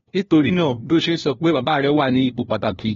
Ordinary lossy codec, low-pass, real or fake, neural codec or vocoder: AAC, 24 kbps; 7.2 kHz; fake; codec, 16 kHz, 1 kbps, FunCodec, trained on LibriTTS, 50 frames a second